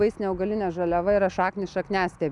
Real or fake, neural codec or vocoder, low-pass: real; none; 10.8 kHz